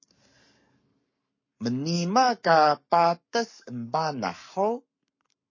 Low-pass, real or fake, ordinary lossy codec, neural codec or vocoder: 7.2 kHz; fake; MP3, 32 kbps; codec, 44.1 kHz, 7.8 kbps, Pupu-Codec